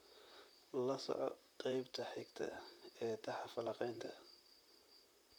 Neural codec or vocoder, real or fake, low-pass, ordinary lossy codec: vocoder, 44.1 kHz, 128 mel bands, Pupu-Vocoder; fake; none; none